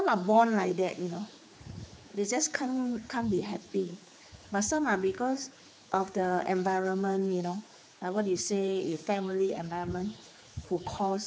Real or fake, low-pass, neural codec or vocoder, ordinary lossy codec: fake; none; codec, 16 kHz, 4 kbps, X-Codec, HuBERT features, trained on general audio; none